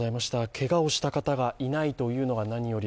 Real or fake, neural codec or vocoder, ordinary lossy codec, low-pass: real; none; none; none